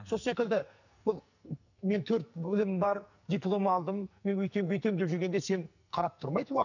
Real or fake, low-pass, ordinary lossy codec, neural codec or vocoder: fake; 7.2 kHz; none; codec, 44.1 kHz, 2.6 kbps, SNAC